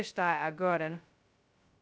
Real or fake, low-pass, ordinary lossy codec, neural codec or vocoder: fake; none; none; codec, 16 kHz, 0.2 kbps, FocalCodec